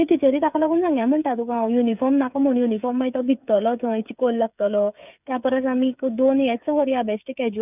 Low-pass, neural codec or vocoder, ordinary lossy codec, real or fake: 3.6 kHz; codec, 16 kHz, 8 kbps, FreqCodec, smaller model; none; fake